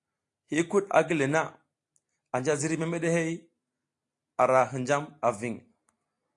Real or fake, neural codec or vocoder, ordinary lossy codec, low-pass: real; none; AAC, 48 kbps; 10.8 kHz